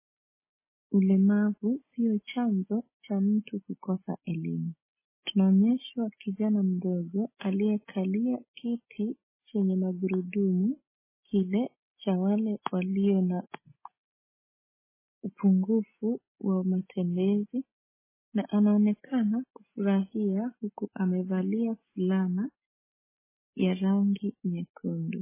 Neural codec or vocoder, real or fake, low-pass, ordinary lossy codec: none; real; 3.6 kHz; MP3, 16 kbps